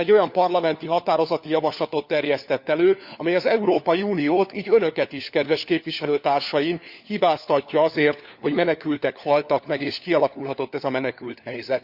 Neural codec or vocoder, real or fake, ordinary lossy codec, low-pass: codec, 16 kHz, 4 kbps, FunCodec, trained on LibriTTS, 50 frames a second; fake; Opus, 64 kbps; 5.4 kHz